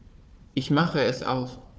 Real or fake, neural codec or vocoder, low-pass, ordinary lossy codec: fake; codec, 16 kHz, 4 kbps, FunCodec, trained on Chinese and English, 50 frames a second; none; none